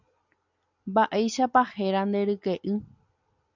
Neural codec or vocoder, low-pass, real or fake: none; 7.2 kHz; real